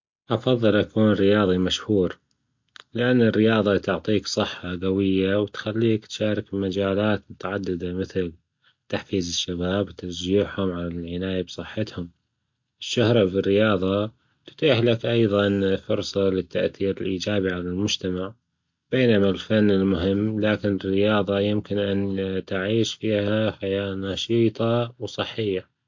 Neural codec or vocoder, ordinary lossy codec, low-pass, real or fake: none; MP3, 48 kbps; 7.2 kHz; real